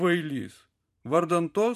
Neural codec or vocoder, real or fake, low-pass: vocoder, 44.1 kHz, 128 mel bands every 512 samples, BigVGAN v2; fake; 14.4 kHz